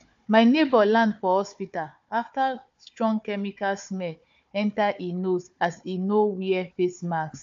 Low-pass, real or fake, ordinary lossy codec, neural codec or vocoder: 7.2 kHz; fake; AAC, 64 kbps; codec, 16 kHz, 4 kbps, FunCodec, trained on Chinese and English, 50 frames a second